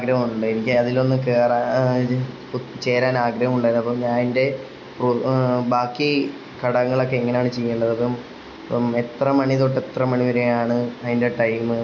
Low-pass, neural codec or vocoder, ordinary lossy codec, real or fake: 7.2 kHz; none; MP3, 48 kbps; real